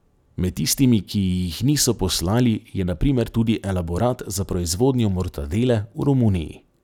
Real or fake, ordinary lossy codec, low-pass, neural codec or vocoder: real; none; 19.8 kHz; none